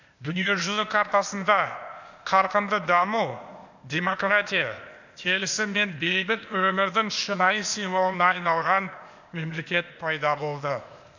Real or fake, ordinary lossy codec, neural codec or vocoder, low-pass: fake; none; codec, 16 kHz, 0.8 kbps, ZipCodec; 7.2 kHz